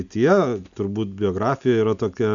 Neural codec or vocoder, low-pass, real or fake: none; 7.2 kHz; real